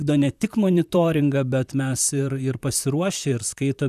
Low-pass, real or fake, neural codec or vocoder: 14.4 kHz; fake; vocoder, 44.1 kHz, 128 mel bands, Pupu-Vocoder